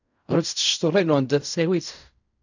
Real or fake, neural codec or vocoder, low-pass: fake; codec, 16 kHz in and 24 kHz out, 0.4 kbps, LongCat-Audio-Codec, fine tuned four codebook decoder; 7.2 kHz